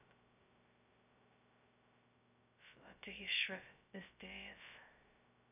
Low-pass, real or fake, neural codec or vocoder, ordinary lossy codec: 3.6 kHz; fake; codec, 16 kHz, 0.2 kbps, FocalCodec; none